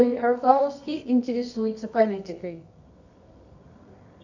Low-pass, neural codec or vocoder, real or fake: 7.2 kHz; codec, 24 kHz, 0.9 kbps, WavTokenizer, medium music audio release; fake